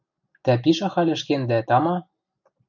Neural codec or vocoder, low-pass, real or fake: none; 7.2 kHz; real